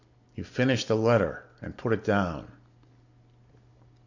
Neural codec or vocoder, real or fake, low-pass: none; real; 7.2 kHz